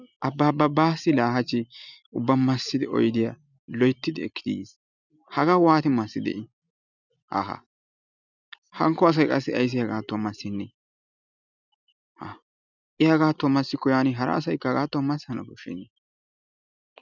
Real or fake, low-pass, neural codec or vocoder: real; 7.2 kHz; none